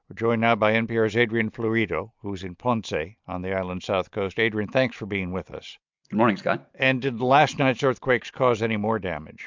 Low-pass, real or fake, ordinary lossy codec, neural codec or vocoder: 7.2 kHz; real; MP3, 64 kbps; none